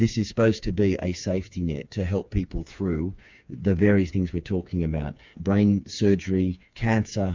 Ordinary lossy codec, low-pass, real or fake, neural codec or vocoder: MP3, 64 kbps; 7.2 kHz; fake; codec, 16 kHz, 4 kbps, FreqCodec, smaller model